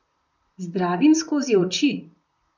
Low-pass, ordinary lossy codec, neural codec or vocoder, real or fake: 7.2 kHz; none; vocoder, 44.1 kHz, 128 mel bands, Pupu-Vocoder; fake